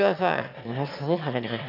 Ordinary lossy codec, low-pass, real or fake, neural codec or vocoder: none; 5.4 kHz; fake; autoencoder, 22.05 kHz, a latent of 192 numbers a frame, VITS, trained on one speaker